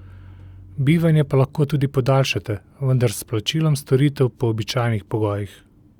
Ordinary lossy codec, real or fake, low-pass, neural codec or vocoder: Opus, 64 kbps; real; 19.8 kHz; none